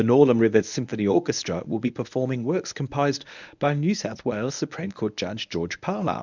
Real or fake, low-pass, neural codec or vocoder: fake; 7.2 kHz; codec, 24 kHz, 0.9 kbps, WavTokenizer, medium speech release version 1